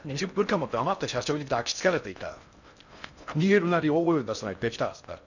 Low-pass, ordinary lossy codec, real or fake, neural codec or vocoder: 7.2 kHz; none; fake; codec, 16 kHz in and 24 kHz out, 0.6 kbps, FocalCodec, streaming, 2048 codes